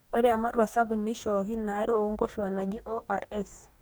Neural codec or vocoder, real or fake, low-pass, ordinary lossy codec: codec, 44.1 kHz, 2.6 kbps, DAC; fake; none; none